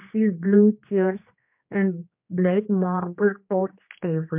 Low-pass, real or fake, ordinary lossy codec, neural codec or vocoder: 3.6 kHz; fake; MP3, 32 kbps; codec, 16 kHz, 2 kbps, X-Codec, HuBERT features, trained on general audio